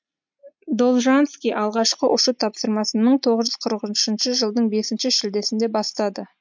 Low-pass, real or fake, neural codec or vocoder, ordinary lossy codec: 7.2 kHz; real; none; MP3, 64 kbps